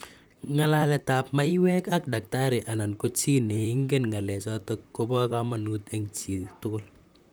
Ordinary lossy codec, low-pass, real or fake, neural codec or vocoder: none; none; fake; vocoder, 44.1 kHz, 128 mel bands, Pupu-Vocoder